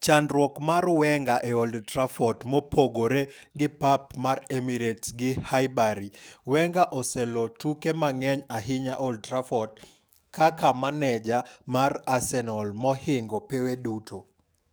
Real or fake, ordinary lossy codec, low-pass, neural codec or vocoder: fake; none; none; codec, 44.1 kHz, 7.8 kbps, DAC